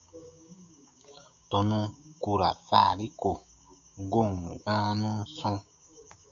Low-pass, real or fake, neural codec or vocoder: 7.2 kHz; fake; codec, 16 kHz, 6 kbps, DAC